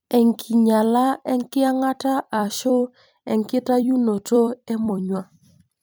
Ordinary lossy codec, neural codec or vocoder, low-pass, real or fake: none; vocoder, 44.1 kHz, 128 mel bands every 256 samples, BigVGAN v2; none; fake